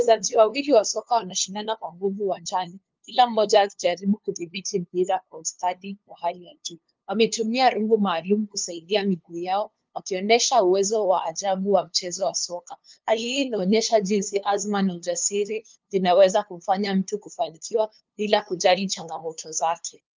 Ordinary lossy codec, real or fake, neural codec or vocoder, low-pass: Opus, 24 kbps; fake; codec, 16 kHz, 2 kbps, FunCodec, trained on LibriTTS, 25 frames a second; 7.2 kHz